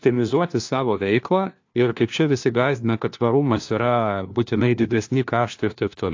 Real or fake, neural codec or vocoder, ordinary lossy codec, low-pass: fake; codec, 16 kHz, 1 kbps, FunCodec, trained on LibriTTS, 50 frames a second; AAC, 48 kbps; 7.2 kHz